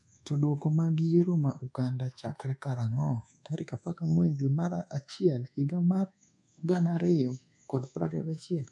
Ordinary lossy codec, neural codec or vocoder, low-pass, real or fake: none; codec, 24 kHz, 1.2 kbps, DualCodec; 10.8 kHz; fake